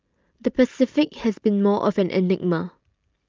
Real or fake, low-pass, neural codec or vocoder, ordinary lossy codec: real; 7.2 kHz; none; Opus, 24 kbps